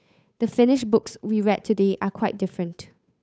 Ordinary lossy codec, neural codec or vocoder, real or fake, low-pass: none; codec, 16 kHz, 8 kbps, FunCodec, trained on Chinese and English, 25 frames a second; fake; none